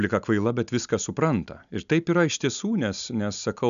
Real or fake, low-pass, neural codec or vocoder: real; 7.2 kHz; none